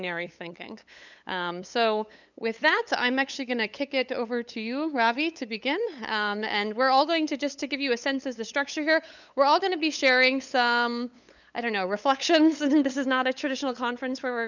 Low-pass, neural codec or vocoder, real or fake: 7.2 kHz; codec, 16 kHz, 8 kbps, FunCodec, trained on LibriTTS, 25 frames a second; fake